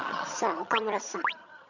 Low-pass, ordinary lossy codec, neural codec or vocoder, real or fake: 7.2 kHz; none; vocoder, 22.05 kHz, 80 mel bands, HiFi-GAN; fake